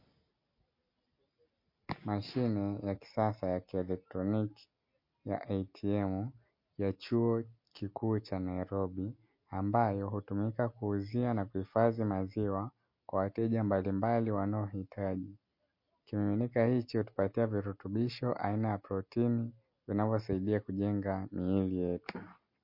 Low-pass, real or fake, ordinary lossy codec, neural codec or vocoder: 5.4 kHz; real; MP3, 32 kbps; none